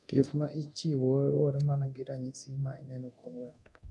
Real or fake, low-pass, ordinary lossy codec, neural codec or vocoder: fake; none; none; codec, 24 kHz, 0.9 kbps, DualCodec